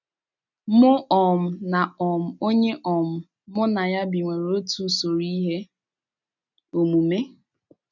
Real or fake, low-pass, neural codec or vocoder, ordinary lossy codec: real; 7.2 kHz; none; none